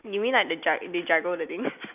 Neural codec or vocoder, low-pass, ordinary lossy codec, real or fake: none; 3.6 kHz; none; real